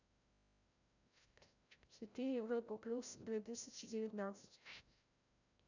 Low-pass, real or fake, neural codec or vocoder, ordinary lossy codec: 7.2 kHz; fake; codec, 16 kHz, 0.5 kbps, FreqCodec, larger model; none